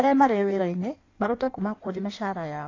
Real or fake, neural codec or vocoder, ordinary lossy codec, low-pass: fake; codec, 16 kHz in and 24 kHz out, 1.1 kbps, FireRedTTS-2 codec; AAC, 32 kbps; 7.2 kHz